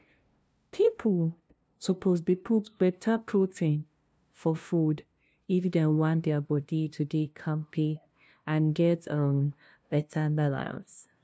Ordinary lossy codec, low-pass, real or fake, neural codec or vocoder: none; none; fake; codec, 16 kHz, 0.5 kbps, FunCodec, trained on LibriTTS, 25 frames a second